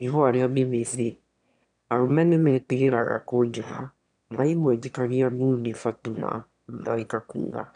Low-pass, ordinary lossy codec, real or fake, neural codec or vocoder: 9.9 kHz; none; fake; autoencoder, 22.05 kHz, a latent of 192 numbers a frame, VITS, trained on one speaker